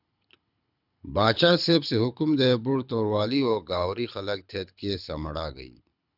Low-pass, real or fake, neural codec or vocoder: 5.4 kHz; fake; codec, 24 kHz, 6 kbps, HILCodec